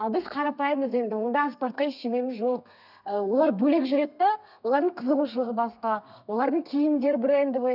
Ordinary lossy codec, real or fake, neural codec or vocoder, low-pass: none; fake; codec, 32 kHz, 1.9 kbps, SNAC; 5.4 kHz